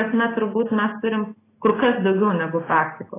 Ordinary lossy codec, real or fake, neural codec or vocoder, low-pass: AAC, 16 kbps; real; none; 3.6 kHz